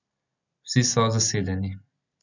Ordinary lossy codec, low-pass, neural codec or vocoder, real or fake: none; 7.2 kHz; none; real